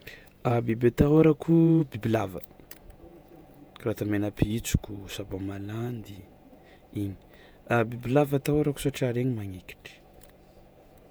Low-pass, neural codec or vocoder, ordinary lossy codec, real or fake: none; vocoder, 48 kHz, 128 mel bands, Vocos; none; fake